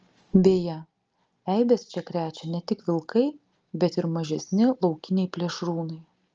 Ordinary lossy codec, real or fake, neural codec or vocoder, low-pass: Opus, 24 kbps; real; none; 7.2 kHz